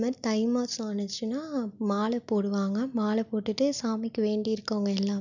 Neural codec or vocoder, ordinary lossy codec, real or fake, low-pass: none; none; real; 7.2 kHz